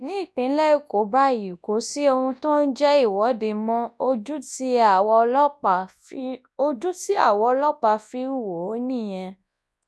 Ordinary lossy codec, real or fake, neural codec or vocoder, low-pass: none; fake; codec, 24 kHz, 0.9 kbps, WavTokenizer, large speech release; none